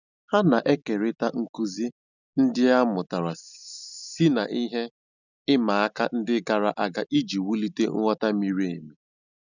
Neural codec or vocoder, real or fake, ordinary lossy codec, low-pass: none; real; none; 7.2 kHz